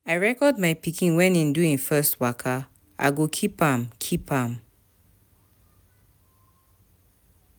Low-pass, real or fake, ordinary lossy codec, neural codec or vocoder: none; real; none; none